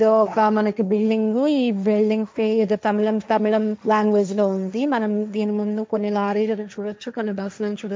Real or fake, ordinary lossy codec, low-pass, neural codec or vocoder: fake; none; none; codec, 16 kHz, 1.1 kbps, Voila-Tokenizer